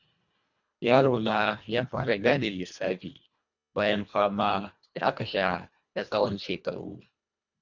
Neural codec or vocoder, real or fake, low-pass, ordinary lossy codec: codec, 24 kHz, 1.5 kbps, HILCodec; fake; 7.2 kHz; none